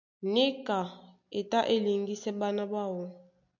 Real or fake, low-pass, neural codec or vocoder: real; 7.2 kHz; none